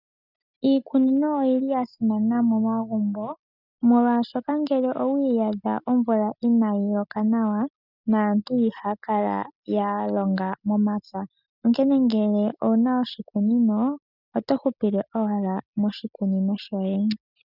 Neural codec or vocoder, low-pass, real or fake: none; 5.4 kHz; real